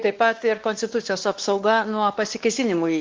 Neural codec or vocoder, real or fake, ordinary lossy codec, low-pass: codec, 16 kHz, 2 kbps, X-Codec, WavLM features, trained on Multilingual LibriSpeech; fake; Opus, 16 kbps; 7.2 kHz